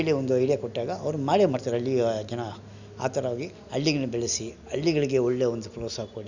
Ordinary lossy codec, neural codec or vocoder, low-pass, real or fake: none; none; 7.2 kHz; real